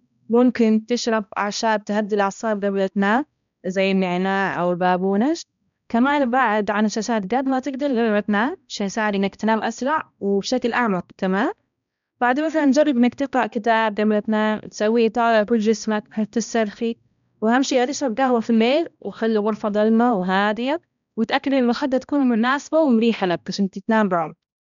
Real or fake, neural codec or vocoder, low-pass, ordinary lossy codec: fake; codec, 16 kHz, 1 kbps, X-Codec, HuBERT features, trained on balanced general audio; 7.2 kHz; none